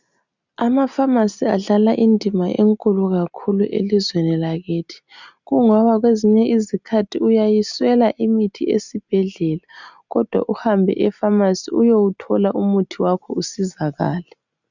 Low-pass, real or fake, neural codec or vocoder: 7.2 kHz; real; none